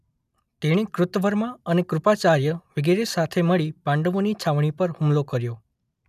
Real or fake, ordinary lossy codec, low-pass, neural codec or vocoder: real; none; 14.4 kHz; none